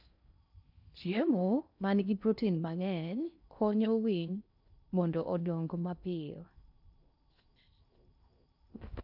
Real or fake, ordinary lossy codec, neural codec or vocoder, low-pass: fake; none; codec, 16 kHz in and 24 kHz out, 0.6 kbps, FocalCodec, streaming, 4096 codes; 5.4 kHz